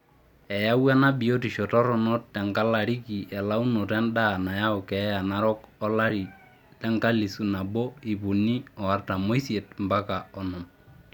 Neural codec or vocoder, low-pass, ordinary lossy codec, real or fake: none; 19.8 kHz; none; real